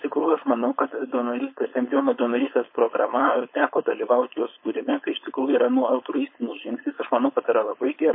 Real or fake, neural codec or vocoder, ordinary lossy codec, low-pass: fake; codec, 16 kHz, 4.8 kbps, FACodec; MP3, 24 kbps; 5.4 kHz